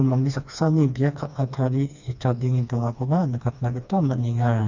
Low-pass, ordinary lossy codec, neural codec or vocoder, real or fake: 7.2 kHz; Opus, 64 kbps; codec, 16 kHz, 2 kbps, FreqCodec, smaller model; fake